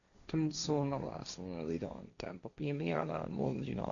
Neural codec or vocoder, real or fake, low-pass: codec, 16 kHz, 1.1 kbps, Voila-Tokenizer; fake; 7.2 kHz